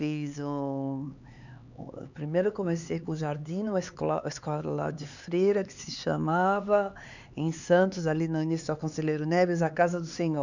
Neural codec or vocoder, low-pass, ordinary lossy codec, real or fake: codec, 16 kHz, 4 kbps, X-Codec, HuBERT features, trained on LibriSpeech; 7.2 kHz; none; fake